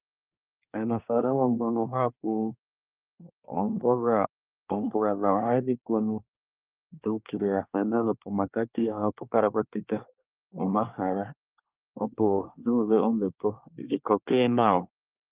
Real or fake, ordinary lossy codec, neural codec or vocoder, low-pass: fake; Opus, 24 kbps; codec, 24 kHz, 1 kbps, SNAC; 3.6 kHz